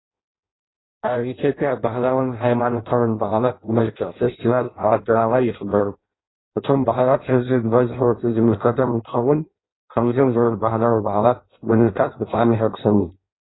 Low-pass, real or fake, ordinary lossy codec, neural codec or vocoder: 7.2 kHz; fake; AAC, 16 kbps; codec, 16 kHz in and 24 kHz out, 0.6 kbps, FireRedTTS-2 codec